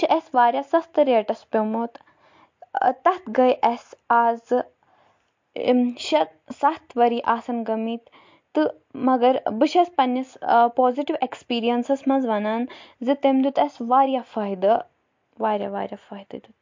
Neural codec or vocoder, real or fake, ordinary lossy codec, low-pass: none; real; MP3, 48 kbps; 7.2 kHz